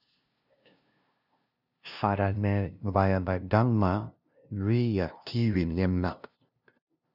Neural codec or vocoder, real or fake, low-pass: codec, 16 kHz, 0.5 kbps, FunCodec, trained on LibriTTS, 25 frames a second; fake; 5.4 kHz